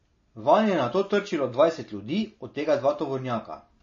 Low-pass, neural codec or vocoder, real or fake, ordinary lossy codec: 7.2 kHz; none; real; MP3, 32 kbps